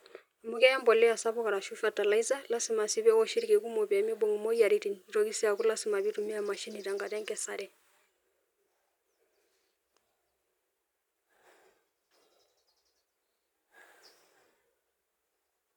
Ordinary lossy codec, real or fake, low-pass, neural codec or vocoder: none; real; 19.8 kHz; none